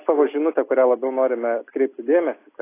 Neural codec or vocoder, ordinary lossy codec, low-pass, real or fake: none; AAC, 24 kbps; 3.6 kHz; real